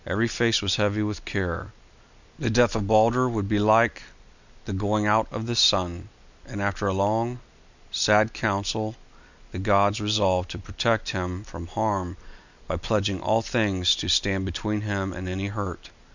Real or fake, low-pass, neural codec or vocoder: real; 7.2 kHz; none